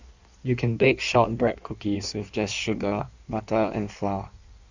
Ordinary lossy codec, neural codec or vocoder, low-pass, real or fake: Opus, 64 kbps; codec, 16 kHz in and 24 kHz out, 1.1 kbps, FireRedTTS-2 codec; 7.2 kHz; fake